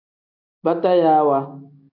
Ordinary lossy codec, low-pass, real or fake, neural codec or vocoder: AAC, 32 kbps; 5.4 kHz; real; none